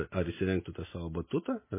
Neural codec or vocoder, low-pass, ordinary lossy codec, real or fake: none; 3.6 kHz; MP3, 16 kbps; real